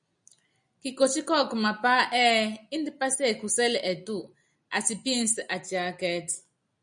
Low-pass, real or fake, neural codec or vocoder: 9.9 kHz; real; none